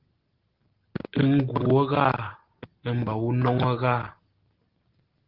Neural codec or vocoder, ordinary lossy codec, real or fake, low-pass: none; Opus, 16 kbps; real; 5.4 kHz